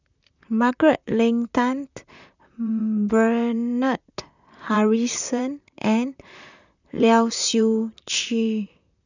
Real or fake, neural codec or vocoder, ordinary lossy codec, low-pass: fake; vocoder, 44.1 kHz, 128 mel bands, Pupu-Vocoder; none; 7.2 kHz